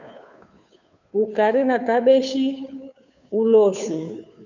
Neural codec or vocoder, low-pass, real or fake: codec, 16 kHz, 2 kbps, FunCodec, trained on Chinese and English, 25 frames a second; 7.2 kHz; fake